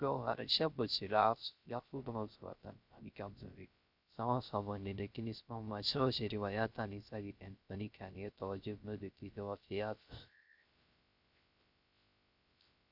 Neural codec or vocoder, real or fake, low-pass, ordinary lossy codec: codec, 16 kHz, 0.3 kbps, FocalCodec; fake; 5.4 kHz; Opus, 64 kbps